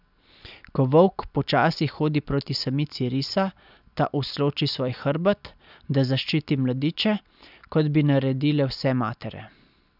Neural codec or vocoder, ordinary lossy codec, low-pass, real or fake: none; none; 5.4 kHz; real